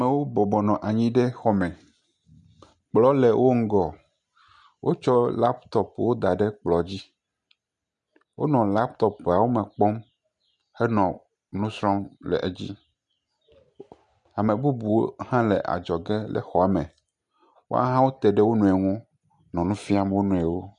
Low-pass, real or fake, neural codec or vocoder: 9.9 kHz; real; none